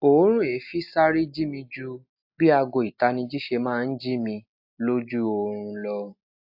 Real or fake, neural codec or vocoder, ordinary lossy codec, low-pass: real; none; AAC, 48 kbps; 5.4 kHz